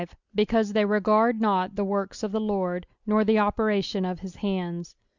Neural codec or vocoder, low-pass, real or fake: none; 7.2 kHz; real